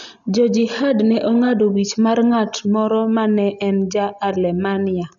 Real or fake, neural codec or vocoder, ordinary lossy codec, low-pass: real; none; none; 7.2 kHz